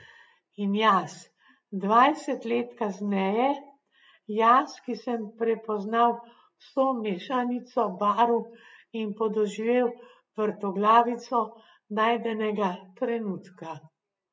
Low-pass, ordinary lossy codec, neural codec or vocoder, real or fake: none; none; none; real